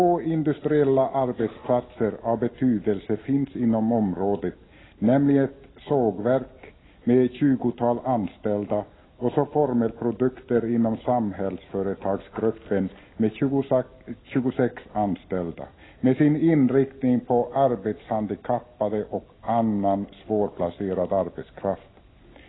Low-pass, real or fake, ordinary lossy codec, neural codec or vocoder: 7.2 kHz; real; AAC, 16 kbps; none